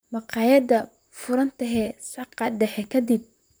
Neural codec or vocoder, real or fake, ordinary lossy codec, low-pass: vocoder, 44.1 kHz, 128 mel bands, Pupu-Vocoder; fake; none; none